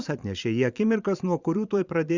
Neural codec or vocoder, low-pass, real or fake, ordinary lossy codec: vocoder, 44.1 kHz, 128 mel bands every 512 samples, BigVGAN v2; 7.2 kHz; fake; Opus, 64 kbps